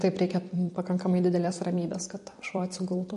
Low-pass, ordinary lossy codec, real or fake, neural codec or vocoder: 14.4 kHz; MP3, 48 kbps; fake; vocoder, 44.1 kHz, 128 mel bands every 256 samples, BigVGAN v2